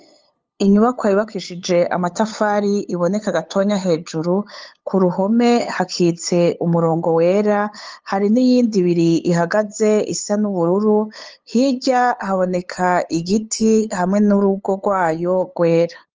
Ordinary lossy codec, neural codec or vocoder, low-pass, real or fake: Opus, 24 kbps; codec, 16 kHz, 8 kbps, FunCodec, trained on LibriTTS, 25 frames a second; 7.2 kHz; fake